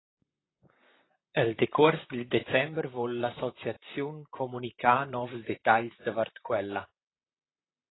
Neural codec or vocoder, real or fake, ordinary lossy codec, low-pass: vocoder, 44.1 kHz, 128 mel bands, Pupu-Vocoder; fake; AAC, 16 kbps; 7.2 kHz